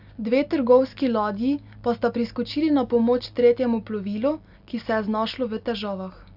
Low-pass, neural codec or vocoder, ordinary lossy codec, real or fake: 5.4 kHz; none; AAC, 48 kbps; real